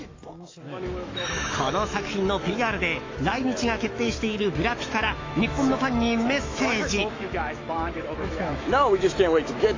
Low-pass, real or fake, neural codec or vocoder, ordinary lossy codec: 7.2 kHz; fake; autoencoder, 48 kHz, 128 numbers a frame, DAC-VAE, trained on Japanese speech; AAC, 32 kbps